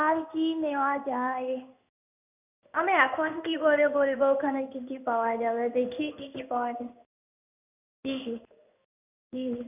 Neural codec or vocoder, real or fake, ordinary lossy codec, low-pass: codec, 16 kHz in and 24 kHz out, 1 kbps, XY-Tokenizer; fake; none; 3.6 kHz